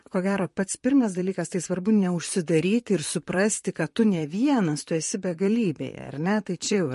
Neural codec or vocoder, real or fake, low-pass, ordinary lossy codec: vocoder, 44.1 kHz, 128 mel bands, Pupu-Vocoder; fake; 14.4 kHz; MP3, 48 kbps